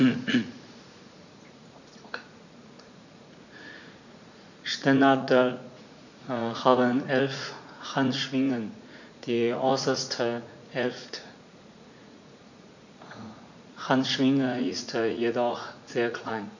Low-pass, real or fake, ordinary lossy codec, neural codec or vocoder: 7.2 kHz; fake; none; vocoder, 44.1 kHz, 80 mel bands, Vocos